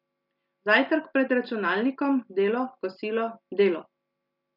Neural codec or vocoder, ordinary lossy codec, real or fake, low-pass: none; none; real; 5.4 kHz